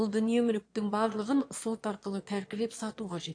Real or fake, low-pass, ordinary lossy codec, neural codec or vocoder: fake; 9.9 kHz; AAC, 48 kbps; autoencoder, 22.05 kHz, a latent of 192 numbers a frame, VITS, trained on one speaker